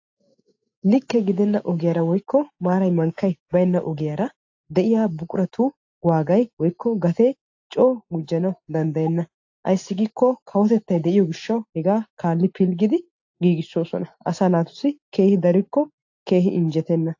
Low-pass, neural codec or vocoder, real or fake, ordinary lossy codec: 7.2 kHz; none; real; AAC, 48 kbps